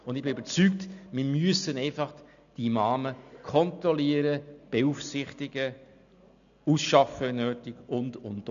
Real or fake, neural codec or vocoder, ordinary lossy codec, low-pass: real; none; none; 7.2 kHz